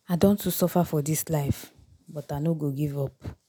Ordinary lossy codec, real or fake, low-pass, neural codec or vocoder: none; real; none; none